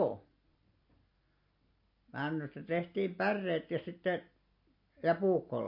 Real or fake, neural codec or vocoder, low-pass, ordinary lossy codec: real; none; 5.4 kHz; MP3, 32 kbps